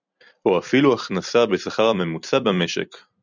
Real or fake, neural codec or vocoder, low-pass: fake; vocoder, 44.1 kHz, 128 mel bands every 256 samples, BigVGAN v2; 7.2 kHz